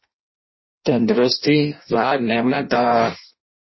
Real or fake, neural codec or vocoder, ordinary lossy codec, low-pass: fake; codec, 16 kHz in and 24 kHz out, 0.6 kbps, FireRedTTS-2 codec; MP3, 24 kbps; 7.2 kHz